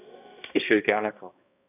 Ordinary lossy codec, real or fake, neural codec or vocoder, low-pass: none; fake; codec, 16 kHz, 1 kbps, X-Codec, HuBERT features, trained on balanced general audio; 3.6 kHz